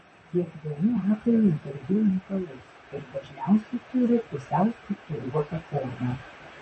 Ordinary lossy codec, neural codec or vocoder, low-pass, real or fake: MP3, 32 kbps; vocoder, 44.1 kHz, 128 mel bands, Pupu-Vocoder; 10.8 kHz; fake